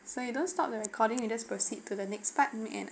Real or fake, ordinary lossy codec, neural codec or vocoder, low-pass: real; none; none; none